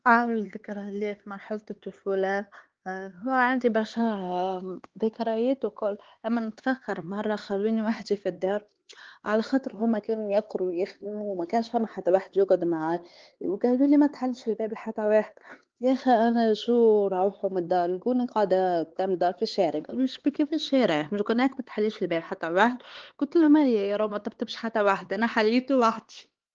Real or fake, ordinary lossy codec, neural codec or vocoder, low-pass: fake; Opus, 16 kbps; codec, 16 kHz, 4 kbps, X-Codec, HuBERT features, trained on LibriSpeech; 7.2 kHz